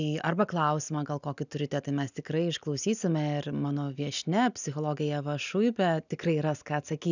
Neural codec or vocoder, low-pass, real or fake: none; 7.2 kHz; real